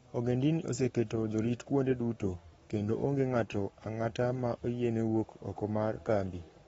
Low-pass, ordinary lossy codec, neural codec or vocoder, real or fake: 19.8 kHz; AAC, 24 kbps; codec, 44.1 kHz, 7.8 kbps, Pupu-Codec; fake